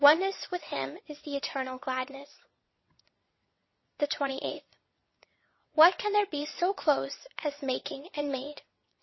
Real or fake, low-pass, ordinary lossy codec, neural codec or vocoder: fake; 7.2 kHz; MP3, 24 kbps; vocoder, 22.05 kHz, 80 mel bands, WaveNeXt